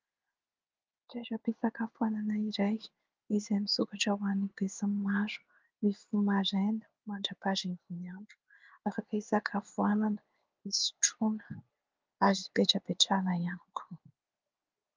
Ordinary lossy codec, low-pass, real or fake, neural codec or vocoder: Opus, 32 kbps; 7.2 kHz; fake; codec, 16 kHz in and 24 kHz out, 1 kbps, XY-Tokenizer